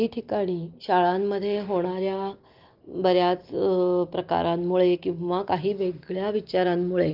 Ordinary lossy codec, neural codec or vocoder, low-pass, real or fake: Opus, 32 kbps; none; 5.4 kHz; real